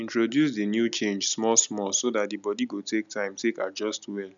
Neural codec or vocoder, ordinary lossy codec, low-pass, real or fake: none; none; 7.2 kHz; real